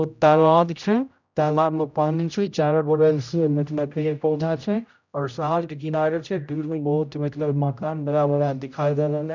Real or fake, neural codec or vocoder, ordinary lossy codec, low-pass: fake; codec, 16 kHz, 0.5 kbps, X-Codec, HuBERT features, trained on general audio; none; 7.2 kHz